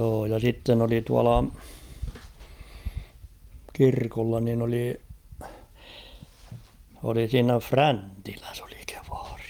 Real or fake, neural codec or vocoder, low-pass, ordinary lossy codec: real; none; 19.8 kHz; Opus, 32 kbps